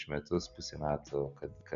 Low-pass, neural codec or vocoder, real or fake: 7.2 kHz; none; real